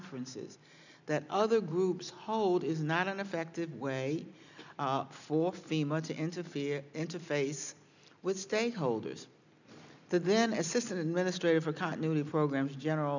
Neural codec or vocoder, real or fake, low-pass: none; real; 7.2 kHz